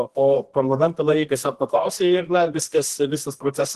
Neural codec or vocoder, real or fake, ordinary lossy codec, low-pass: codec, 24 kHz, 0.9 kbps, WavTokenizer, medium music audio release; fake; Opus, 16 kbps; 10.8 kHz